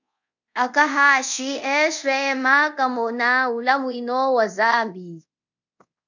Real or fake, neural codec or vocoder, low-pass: fake; codec, 24 kHz, 0.5 kbps, DualCodec; 7.2 kHz